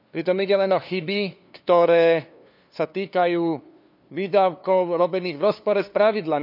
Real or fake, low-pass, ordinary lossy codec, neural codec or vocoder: fake; 5.4 kHz; none; codec, 16 kHz, 2 kbps, FunCodec, trained on LibriTTS, 25 frames a second